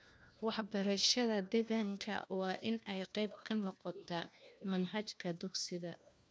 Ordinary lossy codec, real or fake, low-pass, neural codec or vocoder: none; fake; none; codec, 16 kHz, 1 kbps, FunCodec, trained on LibriTTS, 50 frames a second